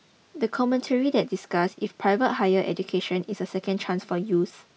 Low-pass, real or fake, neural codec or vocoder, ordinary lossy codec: none; real; none; none